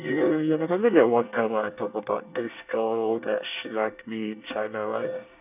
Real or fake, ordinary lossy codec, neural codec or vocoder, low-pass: fake; none; codec, 24 kHz, 1 kbps, SNAC; 3.6 kHz